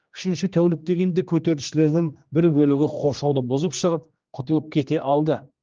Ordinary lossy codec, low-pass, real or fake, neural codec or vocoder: Opus, 24 kbps; 7.2 kHz; fake; codec, 16 kHz, 1 kbps, X-Codec, HuBERT features, trained on general audio